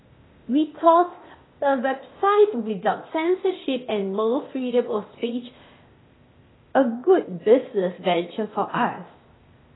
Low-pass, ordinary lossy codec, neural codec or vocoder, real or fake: 7.2 kHz; AAC, 16 kbps; codec, 16 kHz, 0.8 kbps, ZipCodec; fake